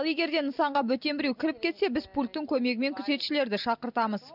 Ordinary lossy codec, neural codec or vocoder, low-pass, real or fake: none; none; 5.4 kHz; real